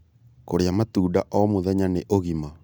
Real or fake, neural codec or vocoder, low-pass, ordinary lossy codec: real; none; none; none